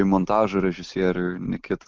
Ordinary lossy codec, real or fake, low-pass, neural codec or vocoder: Opus, 32 kbps; real; 7.2 kHz; none